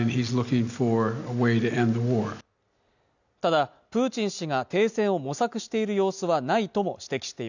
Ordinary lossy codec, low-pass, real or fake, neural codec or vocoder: none; 7.2 kHz; real; none